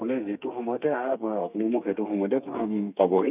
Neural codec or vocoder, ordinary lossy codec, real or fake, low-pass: codec, 32 kHz, 1.9 kbps, SNAC; none; fake; 3.6 kHz